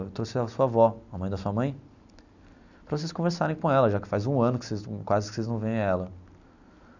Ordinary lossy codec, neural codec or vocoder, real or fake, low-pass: none; none; real; 7.2 kHz